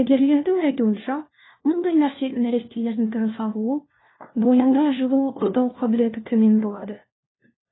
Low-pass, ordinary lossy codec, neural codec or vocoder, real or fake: 7.2 kHz; AAC, 16 kbps; codec, 16 kHz, 1 kbps, FunCodec, trained on LibriTTS, 50 frames a second; fake